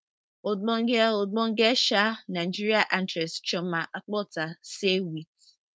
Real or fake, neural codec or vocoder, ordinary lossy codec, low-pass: fake; codec, 16 kHz, 4.8 kbps, FACodec; none; none